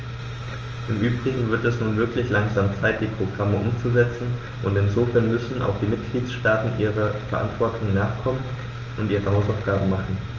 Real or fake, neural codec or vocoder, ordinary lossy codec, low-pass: real; none; Opus, 24 kbps; 7.2 kHz